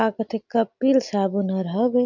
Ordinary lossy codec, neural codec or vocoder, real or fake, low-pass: none; none; real; 7.2 kHz